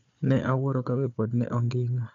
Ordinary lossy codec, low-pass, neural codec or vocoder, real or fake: none; 7.2 kHz; codec, 16 kHz, 4 kbps, FunCodec, trained on Chinese and English, 50 frames a second; fake